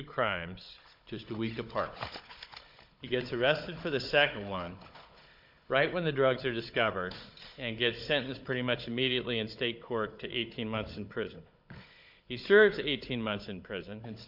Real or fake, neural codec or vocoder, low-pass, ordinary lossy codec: fake; codec, 16 kHz, 16 kbps, FunCodec, trained on Chinese and English, 50 frames a second; 5.4 kHz; MP3, 48 kbps